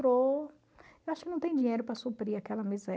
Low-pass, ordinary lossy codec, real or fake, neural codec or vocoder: none; none; real; none